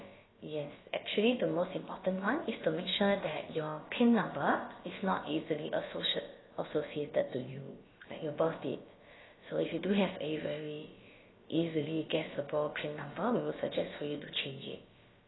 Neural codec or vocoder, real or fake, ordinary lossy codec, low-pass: codec, 16 kHz, about 1 kbps, DyCAST, with the encoder's durations; fake; AAC, 16 kbps; 7.2 kHz